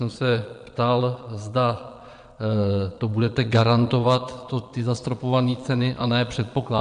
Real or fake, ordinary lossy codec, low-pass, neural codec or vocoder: fake; MP3, 64 kbps; 9.9 kHz; vocoder, 22.05 kHz, 80 mel bands, Vocos